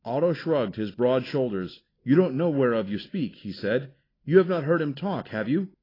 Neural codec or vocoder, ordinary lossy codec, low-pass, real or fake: none; AAC, 24 kbps; 5.4 kHz; real